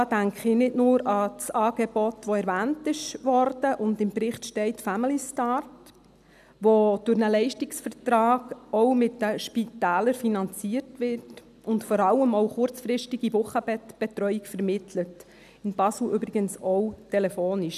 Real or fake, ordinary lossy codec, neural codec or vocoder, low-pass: real; none; none; 14.4 kHz